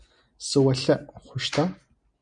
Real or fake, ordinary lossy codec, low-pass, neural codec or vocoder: real; MP3, 96 kbps; 9.9 kHz; none